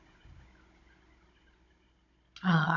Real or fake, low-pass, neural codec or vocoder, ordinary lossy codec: fake; 7.2 kHz; codec, 16 kHz, 16 kbps, FunCodec, trained on Chinese and English, 50 frames a second; none